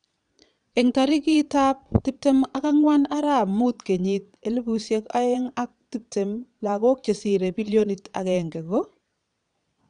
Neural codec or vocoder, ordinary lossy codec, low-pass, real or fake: vocoder, 22.05 kHz, 80 mel bands, Vocos; none; 9.9 kHz; fake